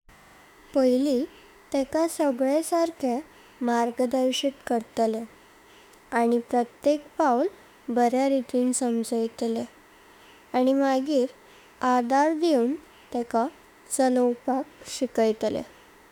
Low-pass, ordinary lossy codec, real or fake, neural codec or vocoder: 19.8 kHz; none; fake; autoencoder, 48 kHz, 32 numbers a frame, DAC-VAE, trained on Japanese speech